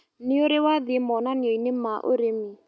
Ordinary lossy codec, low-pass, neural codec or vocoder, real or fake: none; none; none; real